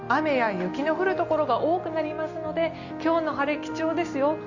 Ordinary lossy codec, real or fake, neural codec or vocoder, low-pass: Opus, 64 kbps; real; none; 7.2 kHz